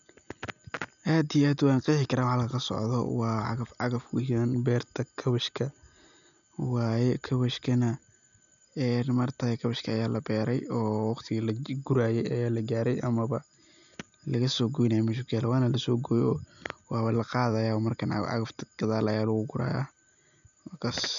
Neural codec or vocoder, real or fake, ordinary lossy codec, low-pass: none; real; none; 7.2 kHz